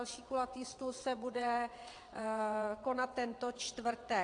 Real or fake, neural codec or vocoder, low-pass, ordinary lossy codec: fake; vocoder, 22.05 kHz, 80 mel bands, WaveNeXt; 9.9 kHz; AAC, 48 kbps